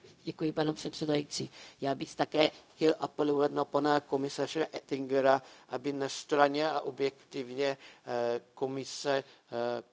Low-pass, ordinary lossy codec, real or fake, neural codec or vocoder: none; none; fake; codec, 16 kHz, 0.4 kbps, LongCat-Audio-Codec